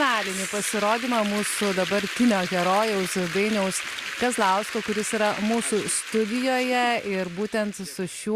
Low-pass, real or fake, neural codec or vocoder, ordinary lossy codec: 14.4 kHz; real; none; Opus, 64 kbps